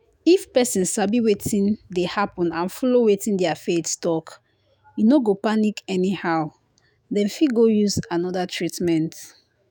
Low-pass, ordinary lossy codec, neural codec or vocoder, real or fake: none; none; autoencoder, 48 kHz, 128 numbers a frame, DAC-VAE, trained on Japanese speech; fake